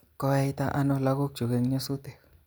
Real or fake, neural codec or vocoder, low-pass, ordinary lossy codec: real; none; none; none